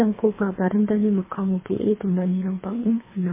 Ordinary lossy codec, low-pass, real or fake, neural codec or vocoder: MP3, 16 kbps; 3.6 kHz; fake; codec, 24 kHz, 3 kbps, HILCodec